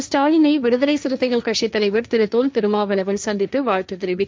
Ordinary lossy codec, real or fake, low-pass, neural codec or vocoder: none; fake; none; codec, 16 kHz, 1.1 kbps, Voila-Tokenizer